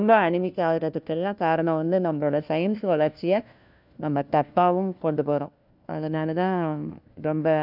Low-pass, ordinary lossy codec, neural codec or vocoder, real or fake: 5.4 kHz; none; codec, 16 kHz, 1 kbps, FunCodec, trained on LibriTTS, 50 frames a second; fake